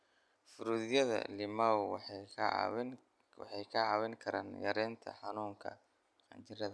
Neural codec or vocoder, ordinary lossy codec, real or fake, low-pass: none; none; real; none